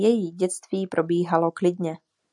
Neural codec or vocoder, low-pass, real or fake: none; 10.8 kHz; real